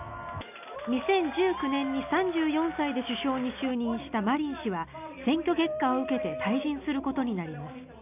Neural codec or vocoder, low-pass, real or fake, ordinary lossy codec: none; 3.6 kHz; real; none